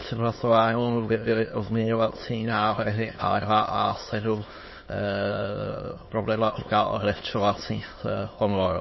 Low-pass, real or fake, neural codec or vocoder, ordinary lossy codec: 7.2 kHz; fake; autoencoder, 22.05 kHz, a latent of 192 numbers a frame, VITS, trained on many speakers; MP3, 24 kbps